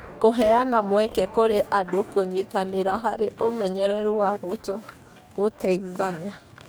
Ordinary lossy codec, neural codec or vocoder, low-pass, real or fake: none; codec, 44.1 kHz, 2.6 kbps, DAC; none; fake